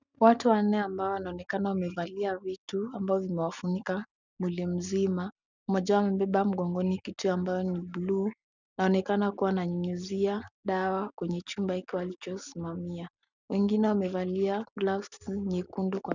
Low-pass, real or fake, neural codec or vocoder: 7.2 kHz; real; none